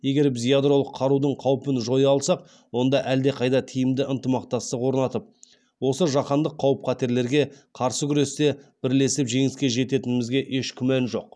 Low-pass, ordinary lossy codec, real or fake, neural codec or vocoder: none; none; real; none